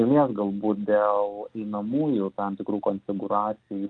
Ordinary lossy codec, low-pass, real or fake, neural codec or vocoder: Opus, 32 kbps; 9.9 kHz; real; none